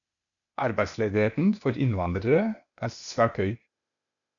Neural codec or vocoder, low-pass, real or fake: codec, 16 kHz, 0.8 kbps, ZipCodec; 7.2 kHz; fake